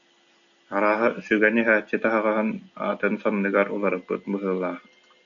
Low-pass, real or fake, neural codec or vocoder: 7.2 kHz; real; none